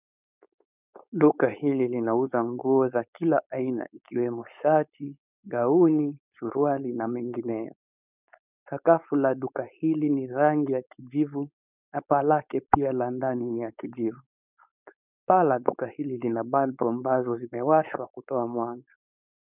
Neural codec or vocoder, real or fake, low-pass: codec, 16 kHz, 4.8 kbps, FACodec; fake; 3.6 kHz